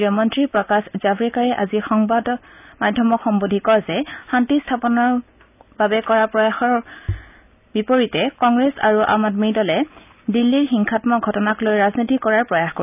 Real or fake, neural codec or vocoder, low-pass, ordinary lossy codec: real; none; 3.6 kHz; none